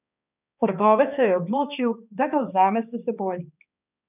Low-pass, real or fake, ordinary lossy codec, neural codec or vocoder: 3.6 kHz; fake; none; codec, 16 kHz, 2 kbps, X-Codec, HuBERT features, trained on balanced general audio